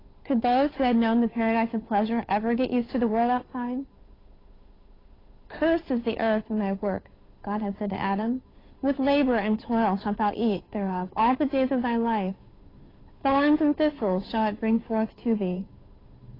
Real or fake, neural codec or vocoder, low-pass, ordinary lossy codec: fake; codec, 16 kHz, 8 kbps, FunCodec, trained on Chinese and English, 25 frames a second; 5.4 kHz; AAC, 24 kbps